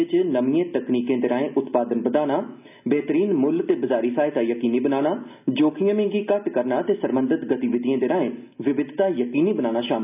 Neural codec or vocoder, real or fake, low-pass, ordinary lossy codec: none; real; 3.6 kHz; none